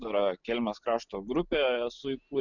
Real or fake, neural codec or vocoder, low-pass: real; none; 7.2 kHz